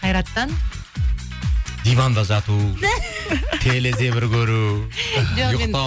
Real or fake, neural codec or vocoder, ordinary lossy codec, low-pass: real; none; none; none